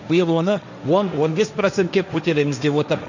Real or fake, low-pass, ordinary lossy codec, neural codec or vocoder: fake; 7.2 kHz; none; codec, 16 kHz, 1.1 kbps, Voila-Tokenizer